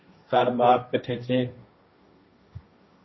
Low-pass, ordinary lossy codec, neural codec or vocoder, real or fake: 7.2 kHz; MP3, 24 kbps; codec, 16 kHz, 1.1 kbps, Voila-Tokenizer; fake